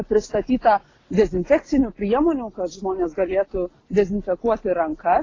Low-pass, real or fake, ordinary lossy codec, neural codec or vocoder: 7.2 kHz; real; AAC, 32 kbps; none